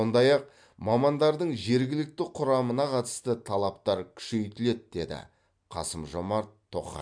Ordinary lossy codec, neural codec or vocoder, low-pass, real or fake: MP3, 64 kbps; none; 9.9 kHz; real